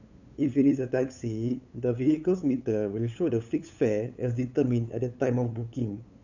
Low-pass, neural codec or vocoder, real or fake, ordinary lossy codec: 7.2 kHz; codec, 16 kHz, 8 kbps, FunCodec, trained on LibriTTS, 25 frames a second; fake; none